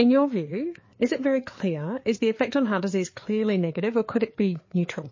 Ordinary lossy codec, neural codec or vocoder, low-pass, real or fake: MP3, 32 kbps; codec, 16 kHz, 4 kbps, FreqCodec, larger model; 7.2 kHz; fake